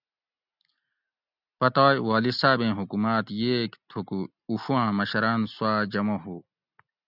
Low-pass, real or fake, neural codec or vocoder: 5.4 kHz; real; none